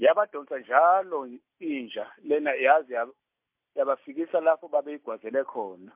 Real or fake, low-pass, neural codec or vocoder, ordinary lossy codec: real; 3.6 kHz; none; MP3, 32 kbps